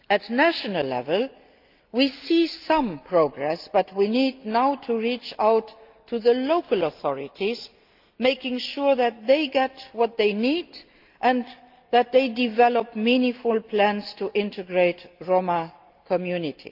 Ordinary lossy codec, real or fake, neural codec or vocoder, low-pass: Opus, 24 kbps; real; none; 5.4 kHz